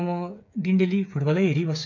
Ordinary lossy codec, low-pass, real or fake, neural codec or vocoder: none; 7.2 kHz; fake; codec, 16 kHz, 8 kbps, FreqCodec, smaller model